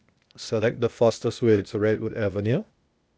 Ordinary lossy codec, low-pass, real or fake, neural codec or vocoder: none; none; fake; codec, 16 kHz, 0.8 kbps, ZipCodec